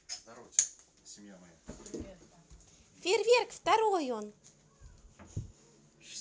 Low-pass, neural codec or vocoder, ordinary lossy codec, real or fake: none; none; none; real